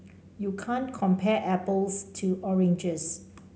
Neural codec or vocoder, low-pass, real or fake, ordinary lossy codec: none; none; real; none